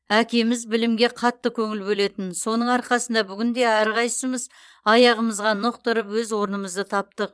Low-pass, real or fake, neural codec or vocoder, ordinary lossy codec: none; fake; vocoder, 22.05 kHz, 80 mel bands, Vocos; none